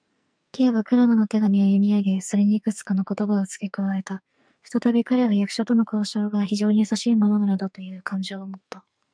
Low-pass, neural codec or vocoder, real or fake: 9.9 kHz; codec, 44.1 kHz, 2.6 kbps, SNAC; fake